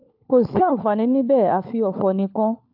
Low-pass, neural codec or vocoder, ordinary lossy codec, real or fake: 5.4 kHz; codec, 16 kHz, 4 kbps, FunCodec, trained on LibriTTS, 50 frames a second; AAC, 48 kbps; fake